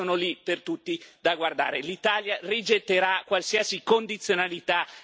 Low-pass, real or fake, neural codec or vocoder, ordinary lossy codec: none; real; none; none